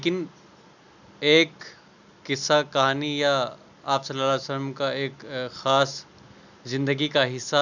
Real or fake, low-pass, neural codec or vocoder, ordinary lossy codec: real; 7.2 kHz; none; none